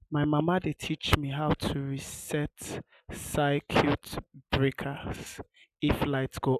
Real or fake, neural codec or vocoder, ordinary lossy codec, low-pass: real; none; none; 14.4 kHz